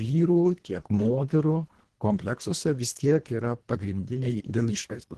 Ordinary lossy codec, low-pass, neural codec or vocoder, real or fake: Opus, 16 kbps; 10.8 kHz; codec, 24 kHz, 1.5 kbps, HILCodec; fake